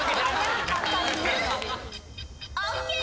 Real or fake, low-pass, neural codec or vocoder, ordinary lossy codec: real; none; none; none